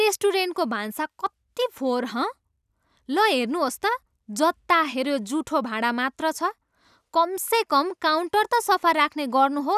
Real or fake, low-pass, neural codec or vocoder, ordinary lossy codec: real; 14.4 kHz; none; none